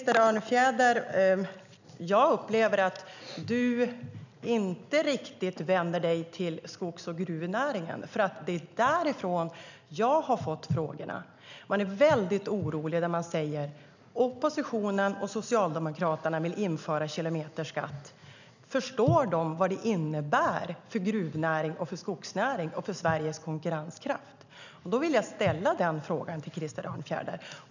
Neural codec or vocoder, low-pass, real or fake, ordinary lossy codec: none; 7.2 kHz; real; none